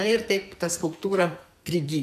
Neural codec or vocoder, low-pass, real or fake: codec, 44.1 kHz, 2.6 kbps, SNAC; 14.4 kHz; fake